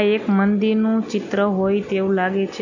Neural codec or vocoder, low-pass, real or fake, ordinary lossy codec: none; 7.2 kHz; real; none